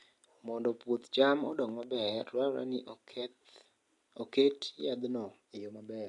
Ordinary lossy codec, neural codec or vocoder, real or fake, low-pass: AAC, 48 kbps; none; real; 10.8 kHz